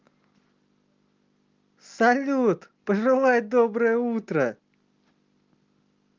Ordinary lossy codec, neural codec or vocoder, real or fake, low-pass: Opus, 32 kbps; none; real; 7.2 kHz